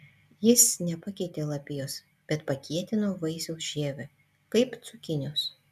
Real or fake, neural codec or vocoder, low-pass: real; none; 14.4 kHz